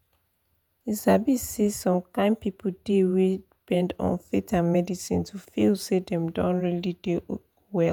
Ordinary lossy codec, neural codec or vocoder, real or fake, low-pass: none; vocoder, 48 kHz, 128 mel bands, Vocos; fake; none